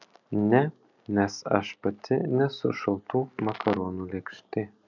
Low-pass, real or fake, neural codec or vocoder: 7.2 kHz; real; none